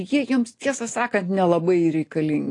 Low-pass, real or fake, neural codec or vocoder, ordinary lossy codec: 10.8 kHz; real; none; AAC, 48 kbps